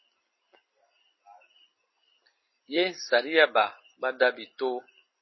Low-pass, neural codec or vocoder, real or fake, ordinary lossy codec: 7.2 kHz; none; real; MP3, 24 kbps